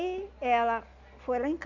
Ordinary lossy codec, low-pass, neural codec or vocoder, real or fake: none; 7.2 kHz; none; real